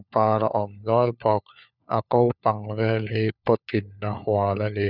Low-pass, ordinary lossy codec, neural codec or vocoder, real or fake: 5.4 kHz; none; codec, 16 kHz, 4 kbps, FunCodec, trained on LibriTTS, 50 frames a second; fake